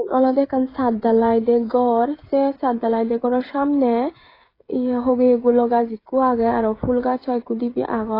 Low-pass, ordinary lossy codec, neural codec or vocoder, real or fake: 5.4 kHz; AAC, 24 kbps; codec, 16 kHz, 16 kbps, FreqCodec, smaller model; fake